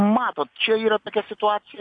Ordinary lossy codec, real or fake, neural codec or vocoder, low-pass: AAC, 48 kbps; real; none; 9.9 kHz